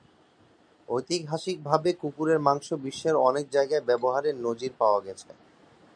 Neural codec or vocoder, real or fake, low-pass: none; real; 9.9 kHz